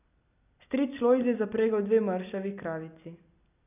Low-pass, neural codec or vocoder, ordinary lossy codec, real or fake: 3.6 kHz; none; none; real